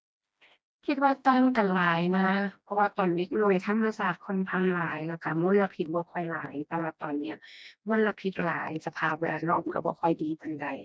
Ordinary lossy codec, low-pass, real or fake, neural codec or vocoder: none; none; fake; codec, 16 kHz, 1 kbps, FreqCodec, smaller model